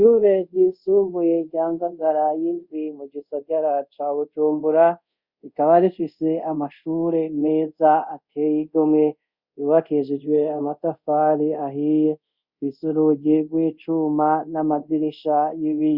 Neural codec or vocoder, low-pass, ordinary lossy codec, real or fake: codec, 24 kHz, 0.5 kbps, DualCodec; 5.4 kHz; Opus, 64 kbps; fake